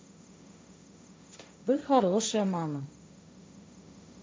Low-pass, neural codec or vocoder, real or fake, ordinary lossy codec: none; codec, 16 kHz, 1.1 kbps, Voila-Tokenizer; fake; none